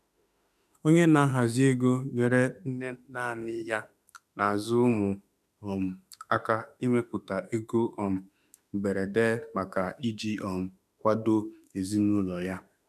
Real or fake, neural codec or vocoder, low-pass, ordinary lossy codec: fake; autoencoder, 48 kHz, 32 numbers a frame, DAC-VAE, trained on Japanese speech; 14.4 kHz; none